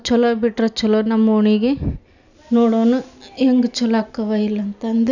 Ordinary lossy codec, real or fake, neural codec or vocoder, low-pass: none; real; none; 7.2 kHz